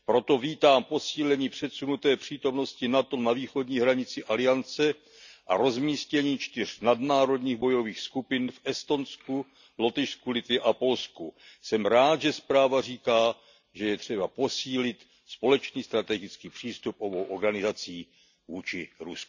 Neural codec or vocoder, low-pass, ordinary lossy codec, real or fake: none; 7.2 kHz; none; real